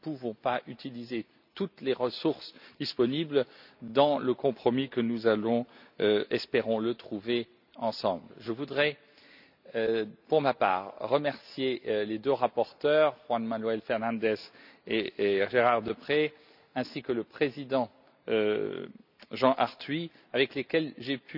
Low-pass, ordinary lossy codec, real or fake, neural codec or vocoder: 5.4 kHz; none; real; none